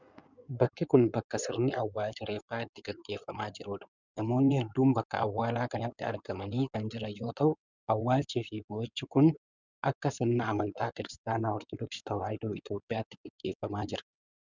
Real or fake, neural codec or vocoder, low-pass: fake; codec, 16 kHz in and 24 kHz out, 2.2 kbps, FireRedTTS-2 codec; 7.2 kHz